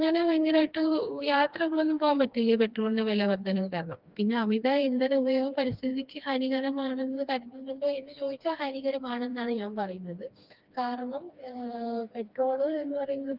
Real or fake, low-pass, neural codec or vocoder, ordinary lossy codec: fake; 5.4 kHz; codec, 16 kHz, 2 kbps, FreqCodec, smaller model; Opus, 32 kbps